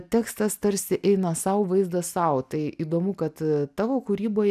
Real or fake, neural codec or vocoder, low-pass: real; none; 14.4 kHz